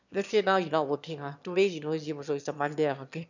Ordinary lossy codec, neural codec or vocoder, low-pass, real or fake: none; autoencoder, 22.05 kHz, a latent of 192 numbers a frame, VITS, trained on one speaker; 7.2 kHz; fake